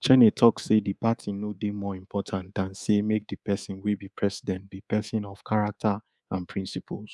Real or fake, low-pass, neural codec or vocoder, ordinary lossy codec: fake; none; codec, 24 kHz, 3.1 kbps, DualCodec; none